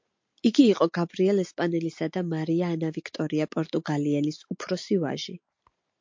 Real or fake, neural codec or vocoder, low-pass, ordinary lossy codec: real; none; 7.2 kHz; MP3, 48 kbps